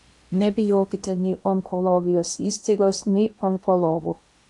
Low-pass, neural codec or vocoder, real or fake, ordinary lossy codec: 10.8 kHz; codec, 16 kHz in and 24 kHz out, 0.8 kbps, FocalCodec, streaming, 65536 codes; fake; AAC, 64 kbps